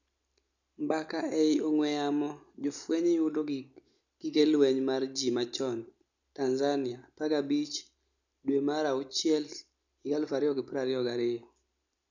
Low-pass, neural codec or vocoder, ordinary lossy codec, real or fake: 7.2 kHz; none; none; real